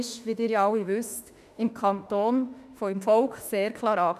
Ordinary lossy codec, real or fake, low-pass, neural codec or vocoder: none; fake; 14.4 kHz; autoencoder, 48 kHz, 32 numbers a frame, DAC-VAE, trained on Japanese speech